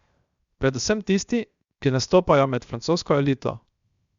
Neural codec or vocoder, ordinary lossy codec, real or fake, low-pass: codec, 16 kHz, 0.7 kbps, FocalCodec; Opus, 64 kbps; fake; 7.2 kHz